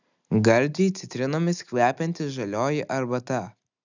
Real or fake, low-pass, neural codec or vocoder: real; 7.2 kHz; none